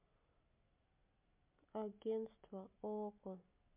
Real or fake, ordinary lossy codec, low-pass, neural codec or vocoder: real; MP3, 32 kbps; 3.6 kHz; none